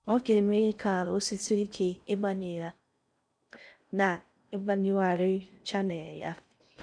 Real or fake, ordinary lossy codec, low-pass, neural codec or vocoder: fake; none; 9.9 kHz; codec, 16 kHz in and 24 kHz out, 0.6 kbps, FocalCodec, streaming, 4096 codes